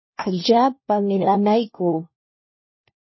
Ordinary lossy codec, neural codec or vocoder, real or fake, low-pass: MP3, 24 kbps; codec, 16 kHz, 1 kbps, FunCodec, trained on LibriTTS, 50 frames a second; fake; 7.2 kHz